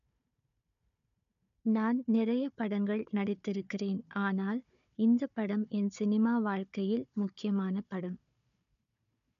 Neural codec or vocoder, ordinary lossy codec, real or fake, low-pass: codec, 16 kHz, 4 kbps, FunCodec, trained on Chinese and English, 50 frames a second; none; fake; 7.2 kHz